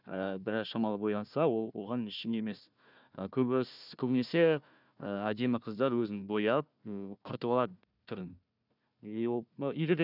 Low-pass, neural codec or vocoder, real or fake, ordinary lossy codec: 5.4 kHz; codec, 16 kHz, 1 kbps, FunCodec, trained on Chinese and English, 50 frames a second; fake; none